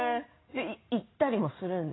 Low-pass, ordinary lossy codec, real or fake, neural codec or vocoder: 7.2 kHz; AAC, 16 kbps; real; none